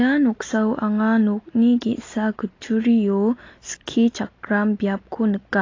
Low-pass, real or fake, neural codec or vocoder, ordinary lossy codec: 7.2 kHz; real; none; AAC, 32 kbps